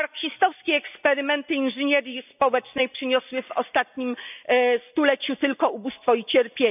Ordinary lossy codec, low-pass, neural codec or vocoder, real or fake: none; 3.6 kHz; none; real